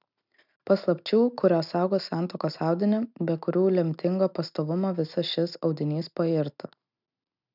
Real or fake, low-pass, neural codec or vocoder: real; 5.4 kHz; none